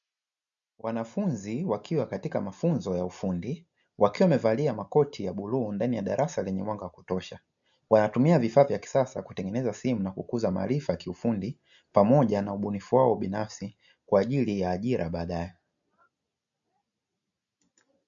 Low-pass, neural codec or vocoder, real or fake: 7.2 kHz; none; real